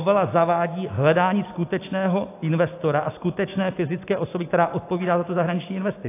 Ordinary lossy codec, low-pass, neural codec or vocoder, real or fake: AAC, 24 kbps; 3.6 kHz; none; real